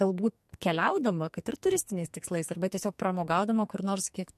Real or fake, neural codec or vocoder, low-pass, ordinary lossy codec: fake; codec, 44.1 kHz, 2.6 kbps, SNAC; 14.4 kHz; MP3, 64 kbps